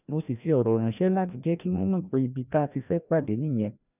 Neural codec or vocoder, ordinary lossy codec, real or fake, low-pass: codec, 16 kHz, 1 kbps, FreqCodec, larger model; none; fake; 3.6 kHz